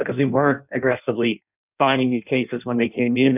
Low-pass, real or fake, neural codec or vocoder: 3.6 kHz; fake; codec, 16 kHz in and 24 kHz out, 0.6 kbps, FireRedTTS-2 codec